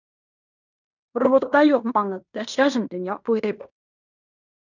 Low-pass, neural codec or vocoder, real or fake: 7.2 kHz; codec, 16 kHz in and 24 kHz out, 0.9 kbps, LongCat-Audio-Codec, fine tuned four codebook decoder; fake